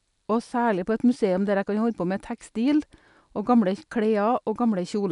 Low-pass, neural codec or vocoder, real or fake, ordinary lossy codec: 10.8 kHz; none; real; none